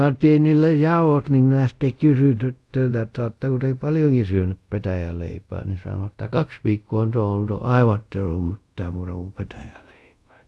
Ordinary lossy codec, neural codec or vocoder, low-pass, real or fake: none; codec, 24 kHz, 0.5 kbps, DualCodec; none; fake